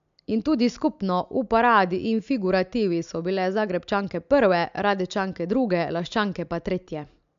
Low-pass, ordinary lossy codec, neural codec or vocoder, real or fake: 7.2 kHz; MP3, 64 kbps; none; real